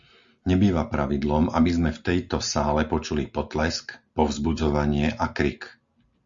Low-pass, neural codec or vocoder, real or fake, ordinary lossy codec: 7.2 kHz; none; real; Opus, 64 kbps